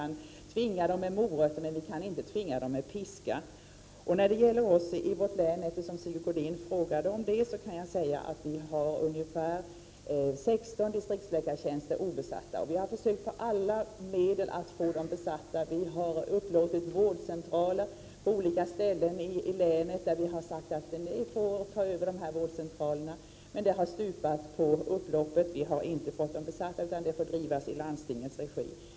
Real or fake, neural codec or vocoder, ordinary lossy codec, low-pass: real; none; none; none